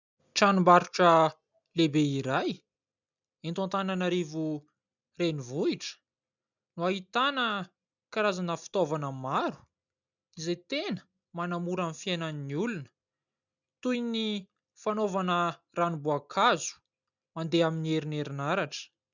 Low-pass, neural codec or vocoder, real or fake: 7.2 kHz; none; real